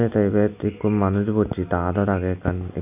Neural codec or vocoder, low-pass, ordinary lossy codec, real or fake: none; 3.6 kHz; AAC, 32 kbps; real